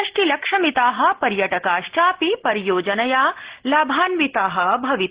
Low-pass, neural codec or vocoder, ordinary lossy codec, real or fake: 3.6 kHz; none; Opus, 16 kbps; real